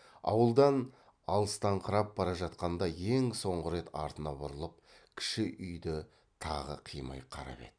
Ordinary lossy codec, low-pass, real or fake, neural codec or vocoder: MP3, 96 kbps; 9.9 kHz; real; none